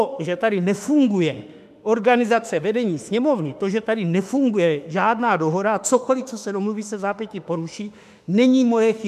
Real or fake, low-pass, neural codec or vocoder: fake; 14.4 kHz; autoencoder, 48 kHz, 32 numbers a frame, DAC-VAE, trained on Japanese speech